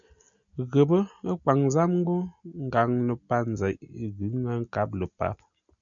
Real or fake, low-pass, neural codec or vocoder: real; 7.2 kHz; none